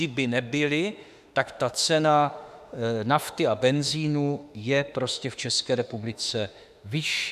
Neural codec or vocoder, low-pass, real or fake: autoencoder, 48 kHz, 32 numbers a frame, DAC-VAE, trained on Japanese speech; 14.4 kHz; fake